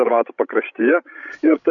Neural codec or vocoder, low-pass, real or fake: codec, 16 kHz, 16 kbps, FreqCodec, larger model; 7.2 kHz; fake